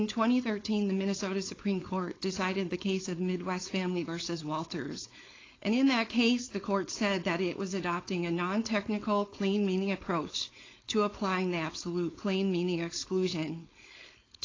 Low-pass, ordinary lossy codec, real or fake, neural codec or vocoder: 7.2 kHz; AAC, 32 kbps; fake; codec, 16 kHz, 4.8 kbps, FACodec